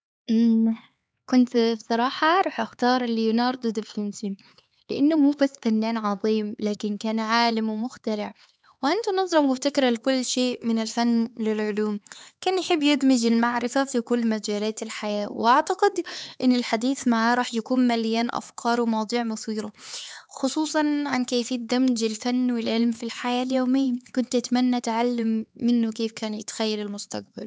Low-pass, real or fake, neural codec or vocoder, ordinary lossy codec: none; fake; codec, 16 kHz, 4 kbps, X-Codec, HuBERT features, trained on LibriSpeech; none